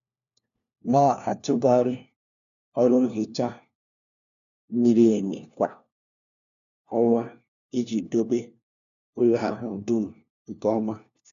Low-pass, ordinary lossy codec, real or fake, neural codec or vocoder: 7.2 kHz; none; fake; codec, 16 kHz, 1 kbps, FunCodec, trained on LibriTTS, 50 frames a second